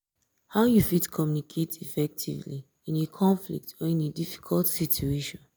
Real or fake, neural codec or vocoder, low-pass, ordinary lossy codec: real; none; none; none